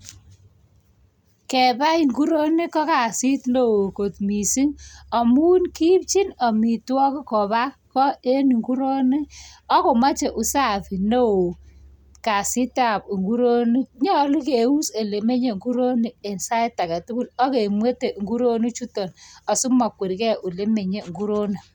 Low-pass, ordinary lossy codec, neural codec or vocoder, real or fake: 19.8 kHz; none; none; real